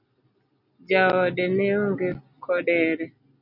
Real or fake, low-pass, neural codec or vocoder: real; 5.4 kHz; none